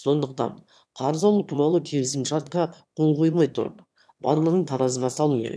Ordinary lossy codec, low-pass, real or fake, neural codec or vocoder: none; none; fake; autoencoder, 22.05 kHz, a latent of 192 numbers a frame, VITS, trained on one speaker